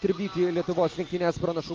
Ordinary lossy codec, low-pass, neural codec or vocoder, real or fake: Opus, 24 kbps; 7.2 kHz; none; real